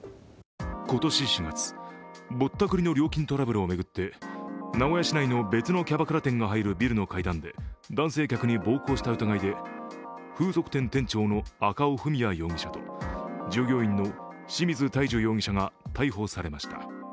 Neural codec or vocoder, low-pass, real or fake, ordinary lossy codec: none; none; real; none